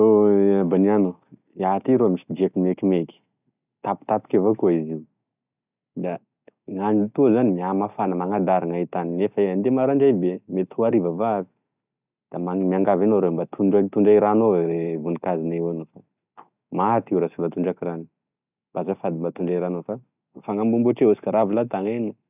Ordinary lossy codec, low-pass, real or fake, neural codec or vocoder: none; 3.6 kHz; real; none